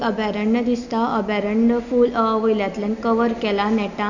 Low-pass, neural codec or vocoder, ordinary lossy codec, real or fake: 7.2 kHz; none; none; real